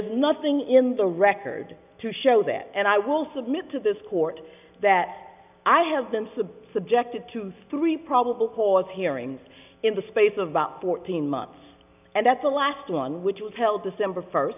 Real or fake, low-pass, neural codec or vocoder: real; 3.6 kHz; none